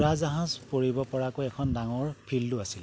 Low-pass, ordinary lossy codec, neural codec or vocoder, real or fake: none; none; none; real